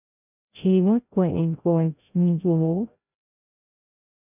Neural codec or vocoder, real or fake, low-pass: codec, 16 kHz, 0.5 kbps, FreqCodec, larger model; fake; 3.6 kHz